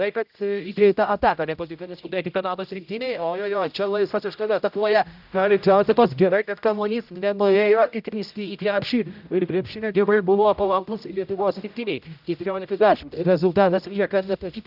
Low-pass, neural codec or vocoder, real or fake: 5.4 kHz; codec, 16 kHz, 0.5 kbps, X-Codec, HuBERT features, trained on general audio; fake